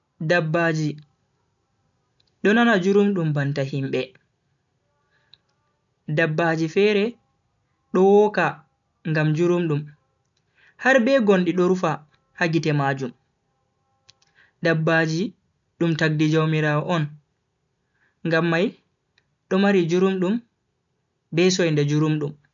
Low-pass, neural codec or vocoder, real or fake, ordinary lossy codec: 7.2 kHz; none; real; none